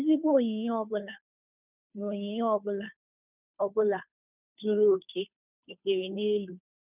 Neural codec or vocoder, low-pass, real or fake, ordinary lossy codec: codec, 16 kHz, 2 kbps, FunCodec, trained on Chinese and English, 25 frames a second; 3.6 kHz; fake; none